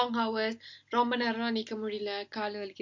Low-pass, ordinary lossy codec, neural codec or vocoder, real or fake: 7.2 kHz; MP3, 48 kbps; none; real